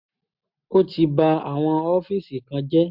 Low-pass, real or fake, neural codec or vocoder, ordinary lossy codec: 5.4 kHz; real; none; MP3, 48 kbps